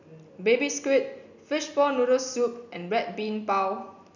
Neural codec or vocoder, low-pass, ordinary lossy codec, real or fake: none; 7.2 kHz; none; real